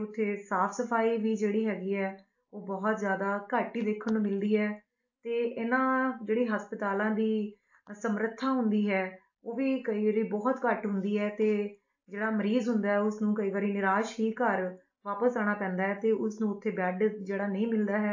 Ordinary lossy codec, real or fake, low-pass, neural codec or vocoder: AAC, 48 kbps; real; 7.2 kHz; none